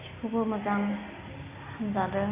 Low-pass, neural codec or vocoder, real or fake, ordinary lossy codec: 3.6 kHz; none; real; Opus, 64 kbps